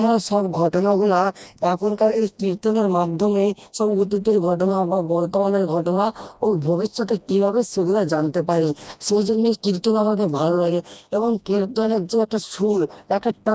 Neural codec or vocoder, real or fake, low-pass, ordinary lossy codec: codec, 16 kHz, 1 kbps, FreqCodec, smaller model; fake; none; none